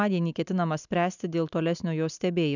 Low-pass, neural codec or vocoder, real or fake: 7.2 kHz; none; real